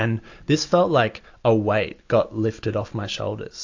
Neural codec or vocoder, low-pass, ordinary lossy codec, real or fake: none; 7.2 kHz; AAC, 48 kbps; real